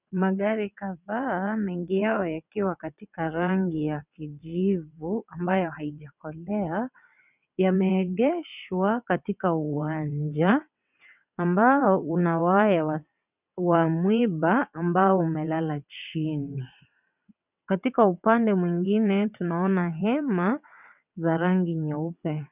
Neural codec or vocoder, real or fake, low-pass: vocoder, 22.05 kHz, 80 mel bands, WaveNeXt; fake; 3.6 kHz